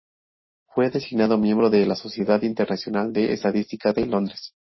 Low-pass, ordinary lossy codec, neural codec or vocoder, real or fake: 7.2 kHz; MP3, 24 kbps; none; real